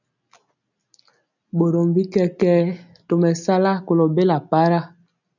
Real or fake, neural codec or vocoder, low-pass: real; none; 7.2 kHz